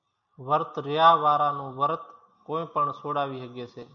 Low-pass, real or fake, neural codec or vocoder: 7.2 kHz; real; none